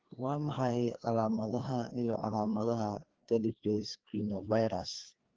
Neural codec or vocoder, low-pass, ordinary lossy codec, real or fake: codec, 16 kHz, 2 kbps, FreqCodec, larger model; 7.2 kHz; Opus, 16 kbps; fake